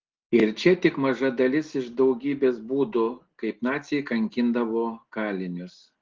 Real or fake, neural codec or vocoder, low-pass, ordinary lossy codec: real; none; 7.2 kHz; Opus, 16 kbps